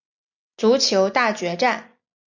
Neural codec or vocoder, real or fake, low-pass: none; real; 7.2 kHz